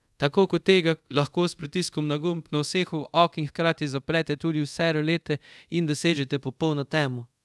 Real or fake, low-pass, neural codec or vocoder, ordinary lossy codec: fake; none; codec, 24 kHz, 0.5 kbps, DualCodec; none